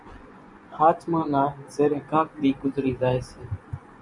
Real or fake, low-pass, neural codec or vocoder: fake; 10.8 kHz; vocoder, 24 kHz, 100 mel bands, Vocos